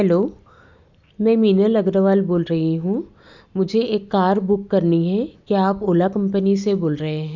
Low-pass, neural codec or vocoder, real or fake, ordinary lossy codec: 7.2 kHz; none; real; Opus, 64 kbps